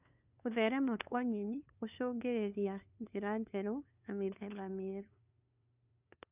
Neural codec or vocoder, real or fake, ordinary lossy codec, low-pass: codec, 16 kHz, 2 kbps, FunCodec, trained on LibriTTS, 25 frames a second; fake; none; 3.6 kHz